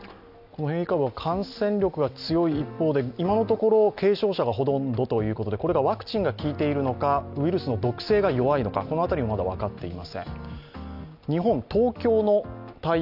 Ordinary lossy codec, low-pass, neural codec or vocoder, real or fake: none; 5.4 kHz; none; real